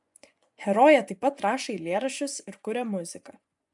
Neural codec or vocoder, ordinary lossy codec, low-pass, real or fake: vocoder, 24 kHz, 100 mel bands, Vocos; MP3, 96 kbps; 10.8 kHz; fake